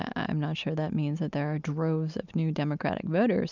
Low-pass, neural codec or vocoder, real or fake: 7.2 kHz; none; real